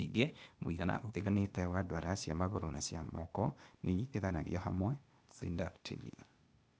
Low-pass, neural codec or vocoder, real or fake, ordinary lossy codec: none; codec, 16 kHz, 0.8 kbps, ZipCodec; fake; none